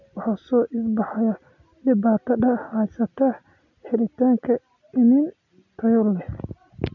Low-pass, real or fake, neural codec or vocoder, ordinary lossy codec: 7.2 kHz; real; none; none